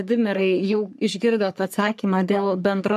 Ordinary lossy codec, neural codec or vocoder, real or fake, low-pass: AAC, 96 kbps; codec, 44.1 kHz, 3.4 kbps, Pupu-Codec; fake; 14.4 kHz